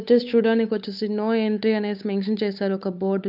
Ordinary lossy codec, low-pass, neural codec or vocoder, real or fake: none; 5.4 kHz; codec, 16 kHz, 8 kbps, FunCodec, trained on Chinese and English, 25 frames a second; fake